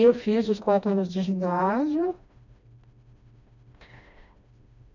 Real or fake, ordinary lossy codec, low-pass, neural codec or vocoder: fake; none; 7.2 kHz; codec, 16 kHz, 1 kbps, FreqCodec, smaller model